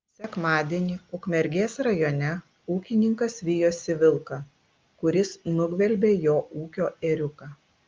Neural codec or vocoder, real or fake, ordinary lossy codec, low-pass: none; real; Opus, 24 kbps; 7.2 kHz